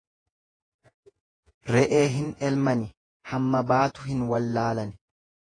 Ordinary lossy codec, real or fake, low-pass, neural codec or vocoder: AAC, 32 kbps; fake; 9.9 kHz; vocoder, 48 kHz, 128 mel bands, Vocos